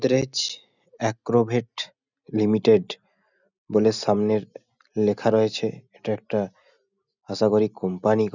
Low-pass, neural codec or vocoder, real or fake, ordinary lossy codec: 7.2 kHz; none; real; none